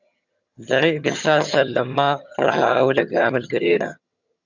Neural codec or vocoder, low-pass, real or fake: vocoder, 22.05 kHz, 80 mel bands, HiFi-GAN; 7.2 kHz; fake